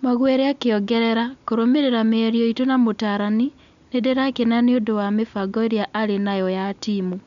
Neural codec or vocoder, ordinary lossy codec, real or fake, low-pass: none; none; real; 7.2 kHz